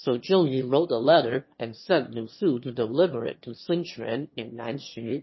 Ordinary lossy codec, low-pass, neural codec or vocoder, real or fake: MP3, 24 kbps; 7.2 kHz; autoencoder, 22.05 kHz, a latent of 192 numbers a frame, VITS, trained on one speaker; fake